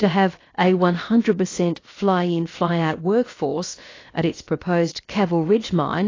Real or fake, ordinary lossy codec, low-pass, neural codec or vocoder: fake; AAC, 32 kbps; 7.2 kHz; codec, 16 kHz, about 1 kbps, DyCAST, with the encoder's durations